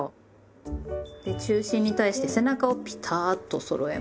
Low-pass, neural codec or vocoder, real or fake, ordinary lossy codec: none; none; real; none